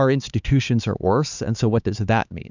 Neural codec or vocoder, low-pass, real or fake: codec, 16 kHz, 4 kbps, X-Codec, HuBERT features, trained on LibriSpeech; 7.2 kHz; fake